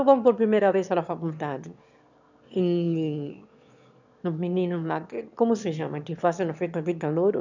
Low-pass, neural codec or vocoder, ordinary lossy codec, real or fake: 7.2 kHz; autoencoder, 22.05 kHz, a latent of 192 numbers a frame, VITS, trained on one speaker; none; fake